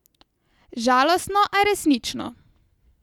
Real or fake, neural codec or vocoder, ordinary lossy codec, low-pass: real; none; none; 19.8 kHz